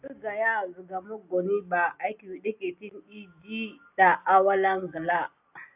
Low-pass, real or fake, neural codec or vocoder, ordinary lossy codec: 3.6 kHz; real; none; AAC, 32 kbps